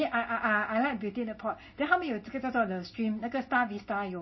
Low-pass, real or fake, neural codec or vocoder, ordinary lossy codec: 7.2 kHz; real; none; MP3, 24 kbps